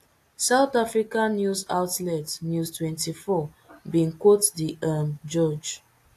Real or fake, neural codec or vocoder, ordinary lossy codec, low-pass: real; none; AAC, 64 kbps; 14.4 kHz